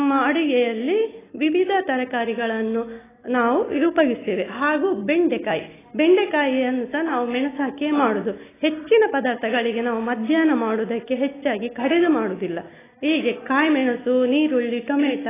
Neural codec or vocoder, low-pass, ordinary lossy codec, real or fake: none; 3.6 kHz; AAC, 16 kbps; real